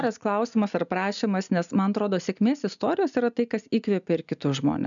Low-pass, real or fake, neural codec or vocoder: 7.2 kHz; real; none